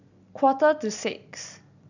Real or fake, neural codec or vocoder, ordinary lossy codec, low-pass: real; none; none; 7.2 kHz